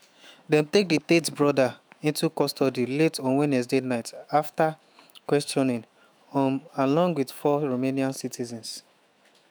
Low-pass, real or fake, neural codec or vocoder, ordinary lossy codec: none; fake; autoencoder, 48 kHz, 128 numbers a frame, DAC-VAE, trained on Japanese speech; none